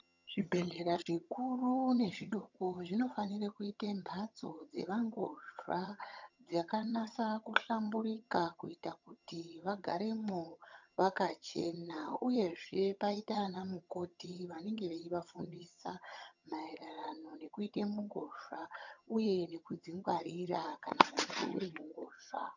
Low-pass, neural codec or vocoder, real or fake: 7.2 kHz; vocoder, 22.05 kHz, 80 mel bands, HiFi-GAN; fake